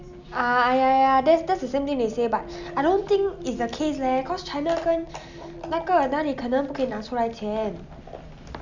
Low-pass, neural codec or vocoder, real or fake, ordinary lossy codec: 7.2 kHz; none; real; none